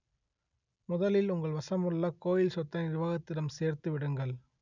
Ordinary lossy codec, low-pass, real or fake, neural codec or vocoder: none; 7.2 kHz; real; none